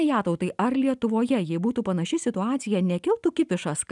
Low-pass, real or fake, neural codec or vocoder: 10.8 kHz; real; none